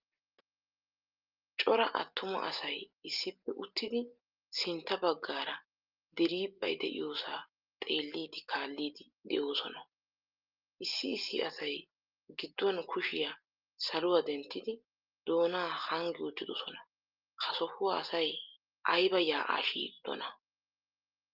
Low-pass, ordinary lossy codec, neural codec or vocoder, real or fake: 5.4 kHz; Opus, 24 kbps; none; real